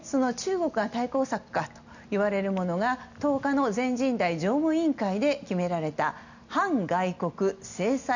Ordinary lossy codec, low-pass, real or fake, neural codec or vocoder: none; 7.2 kHz; real; none